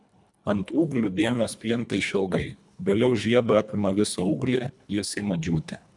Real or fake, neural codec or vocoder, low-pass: fake; codec, 24 kHz, 1.5 kbps, HILCodec; 10.8 kHz